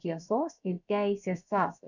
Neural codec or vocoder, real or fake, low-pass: codec, 16 kHz, about 1 kbps, DyCAST, with the encoder's durations; fake; 7.2 kHz